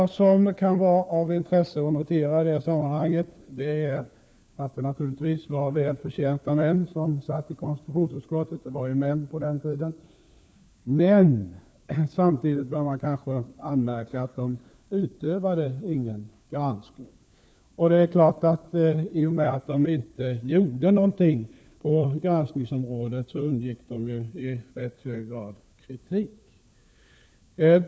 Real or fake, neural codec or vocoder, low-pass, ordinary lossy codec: fake; codec, 16 kHz, 4 kbps, FunCodec, trained on LibriTTS, 50 frames a second; none; none